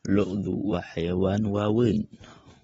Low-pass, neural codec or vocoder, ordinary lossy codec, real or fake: 19.8 kHz; none; AAC, 24 kbps; real